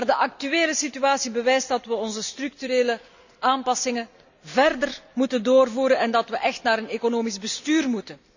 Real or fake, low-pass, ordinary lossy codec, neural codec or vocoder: real; 7.2 kHz; none; none